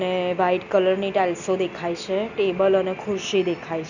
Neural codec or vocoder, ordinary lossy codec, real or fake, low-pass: none; none; real; 7.2 kHz